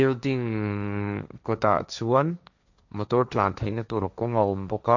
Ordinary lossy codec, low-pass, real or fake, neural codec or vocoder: none; 7.2 kHz; fake; codec, 16 kHz, 1.1 kbps, Voila-Tokenizer